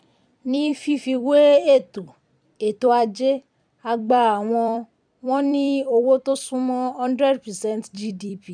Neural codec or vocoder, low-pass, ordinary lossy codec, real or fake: vocoder, 44.1 kHz, 128 mel bands every 256 samples, BigVGAN v2; 9.9 kHz; none; fake